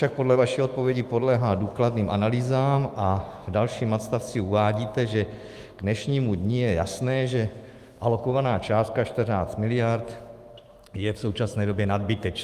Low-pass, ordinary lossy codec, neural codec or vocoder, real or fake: 14.4 kHz; Opus, 32 kbps; autoencoder, 48 kHz, 128 numbers a frame, DAC-VAE, trained on Japanese speech; fake